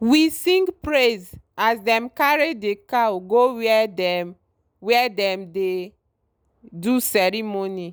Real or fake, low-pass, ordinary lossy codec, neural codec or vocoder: real; none; none; none